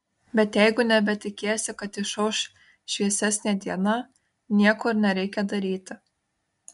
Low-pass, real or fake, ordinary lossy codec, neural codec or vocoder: 10.8 kHz; real; MP3, 64 kbps; none